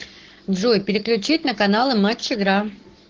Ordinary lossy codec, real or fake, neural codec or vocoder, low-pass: Opus, 16 kbps; fake; codec, 44.1 kHz, 7.8 kbps, Pupu-Codec; 7.2 kHz